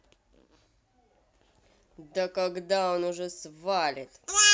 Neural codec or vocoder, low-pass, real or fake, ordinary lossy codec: none; none; real; none